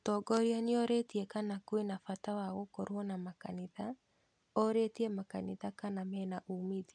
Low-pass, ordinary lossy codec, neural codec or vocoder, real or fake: 9.9 kHz; none; none; real